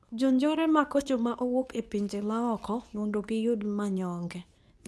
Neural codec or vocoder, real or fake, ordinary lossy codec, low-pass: codec, 24 kHz, 0.9 kbps, WavTokenizer, medium speech release version 2; fake; none; none